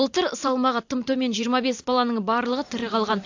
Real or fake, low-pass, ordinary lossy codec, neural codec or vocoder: fake; 7.2 kHz; AAC, 48 kbps; vocoder, 44.1 kHz, 128 mel bands every 512 samples, BigVGAN v2